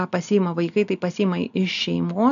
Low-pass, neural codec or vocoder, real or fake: 7.2 kHz; none; real